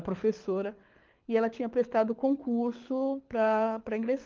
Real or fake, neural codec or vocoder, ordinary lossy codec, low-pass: fake; codec, 24 kHz, 6 kbps, HILCodec; Opus, 32 kbps; 7.2 kHz